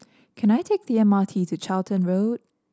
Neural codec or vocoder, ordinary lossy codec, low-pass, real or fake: none; none; none; real